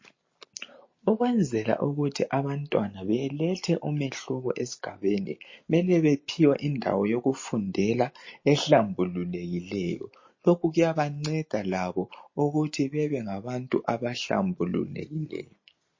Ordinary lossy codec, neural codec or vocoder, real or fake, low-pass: MP3, 32 kbps; none; real; 7.2 kHz